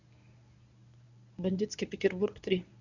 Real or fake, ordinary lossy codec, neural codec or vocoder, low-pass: fake; none; codec, 24 kHz, 0.9 kbps, WavTokenizer, medium speech release version 1; 7.2 kHz